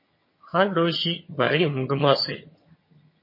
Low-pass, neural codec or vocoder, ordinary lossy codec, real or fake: 5.4 kHz; vocoder, 22.05 kHz, 80 mel bands, HiFi-GAN; MP3, 24 kbps; fake